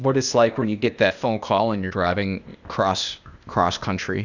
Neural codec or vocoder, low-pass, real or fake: codec, 16 kHz, 0.8 kbps, ZipCodec; 7.2 kHz; fake